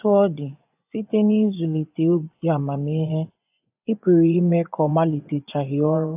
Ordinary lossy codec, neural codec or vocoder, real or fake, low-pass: AAC, 32 kbps; vocoder, 24 kHz, 100 mel bands, Vocos; fake; 3.6 kHz